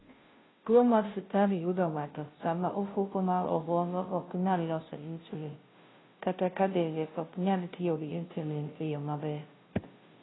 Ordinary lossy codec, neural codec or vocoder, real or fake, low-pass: AAC, 16 kbps; codec, 16 kHz, 0.5 kbps, FunCodec, trained on Chinese and English, 25 frames a second; fake; 7.2 kHz